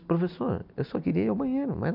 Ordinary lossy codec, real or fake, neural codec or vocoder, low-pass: none; real; none; 5.4 kHz